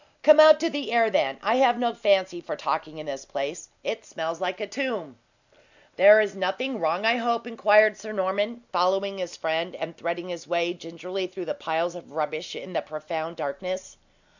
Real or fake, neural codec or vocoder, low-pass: real; none; 7.2 kHz